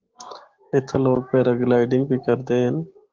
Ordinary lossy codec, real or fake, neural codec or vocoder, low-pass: Opus, 16 kbps; fake; codec, 16 kHz, 6 kbps, DAC; 7.2 kHz